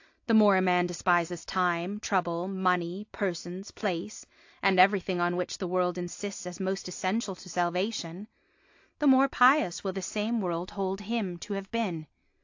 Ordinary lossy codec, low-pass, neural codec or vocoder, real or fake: AAC, 48 kbps; 7.2 kHz; none; real